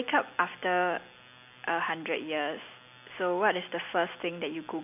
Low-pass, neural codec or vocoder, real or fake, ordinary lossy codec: 3.6 kHz; none; real; none